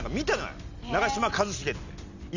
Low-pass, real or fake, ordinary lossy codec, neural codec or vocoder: 7.2 kHz; real; none; none